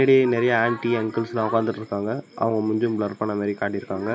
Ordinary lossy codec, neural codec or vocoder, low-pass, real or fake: none; none; none; real